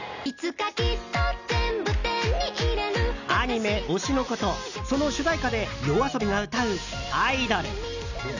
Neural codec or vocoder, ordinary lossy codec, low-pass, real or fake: none; none; 7.2 kHz; real